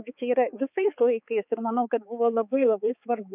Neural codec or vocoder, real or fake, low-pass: codec, 16 kHz, 4 kbps, X-Codec, HuBERT features, trained on balanced general audio; fake; 3.6 kHz